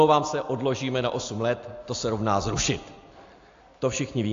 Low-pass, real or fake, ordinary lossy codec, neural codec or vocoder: 7.2 kHz; real; AAC, 48 kbps; none